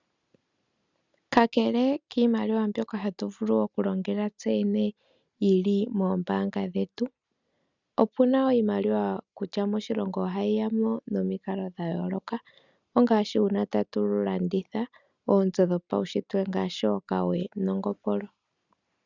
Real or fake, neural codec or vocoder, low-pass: real; none; 7.2 kHz